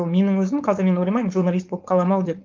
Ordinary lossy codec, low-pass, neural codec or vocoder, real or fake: Opus, 24 kbps; 7.2 kHz; codec, 16 kHz, 4.8 kbps, FACodec; fake